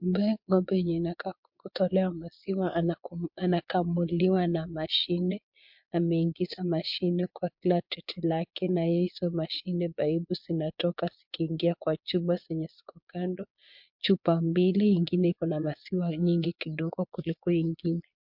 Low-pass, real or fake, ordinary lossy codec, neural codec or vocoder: 5.4 kHz; fake; MP3, 48 kbps; vocoder, 22.05 kHz, 80 mel bands, Vocos